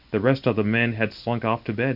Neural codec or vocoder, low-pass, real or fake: none; 5.4 kHz; real